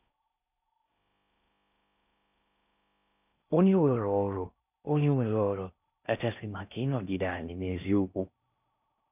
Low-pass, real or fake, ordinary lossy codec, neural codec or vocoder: 3.6 kHz; fake; none; codec, 16 kHz in and 24 kHz out, 0.6 kbps, FocalCodec, streaming, 4096 codes